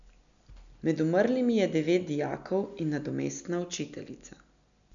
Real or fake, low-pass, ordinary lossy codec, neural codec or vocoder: real; 7.2 kHz; none; none